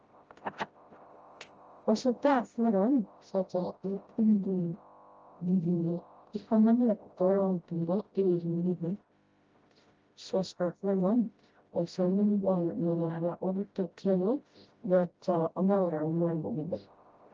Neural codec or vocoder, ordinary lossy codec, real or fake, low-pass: codec, 16 kHz, 0.5 kbps, FreqCodec, smaller model; Opus, 24 kbps; fake; 7.2 kHz